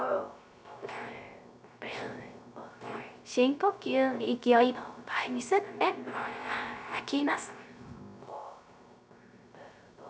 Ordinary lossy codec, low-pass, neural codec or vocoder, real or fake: none; none; codec, 16 kHz, 0.3 kbps, FocalCodec; fake